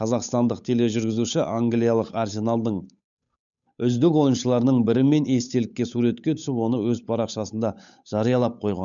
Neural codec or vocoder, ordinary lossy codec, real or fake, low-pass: codec, 16 kHz, 8 kbps, FunCodec, trained on Chinese and English, 25 frames a second; none; fake; 7.2 kHz